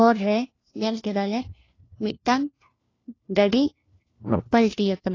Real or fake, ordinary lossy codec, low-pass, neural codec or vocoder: fake; AAC, 32 kbps; 7.2 kHz; codec, 16 kHz, 1 kbps, FunCodec, trained on LibriTTS, 50 frames a second